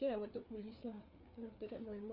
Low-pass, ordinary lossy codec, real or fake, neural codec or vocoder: 5.4 kHz; none; fake; codec, 16 kHz, 4 kbps, FreqCodec, larger model